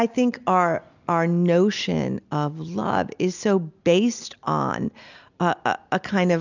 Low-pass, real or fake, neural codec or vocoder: 7.2 kHz; real; none